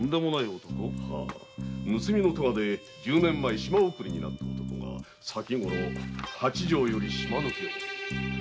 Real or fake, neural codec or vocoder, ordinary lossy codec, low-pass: real; none; none; none